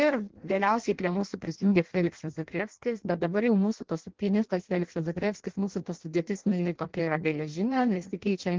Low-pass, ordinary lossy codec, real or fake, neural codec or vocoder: 7.2 kHz; Opus, 16 kbps; fake; codec, 16 kHz in and 24 kHz out, 0.6 kbps, FireRedTTS-2 codec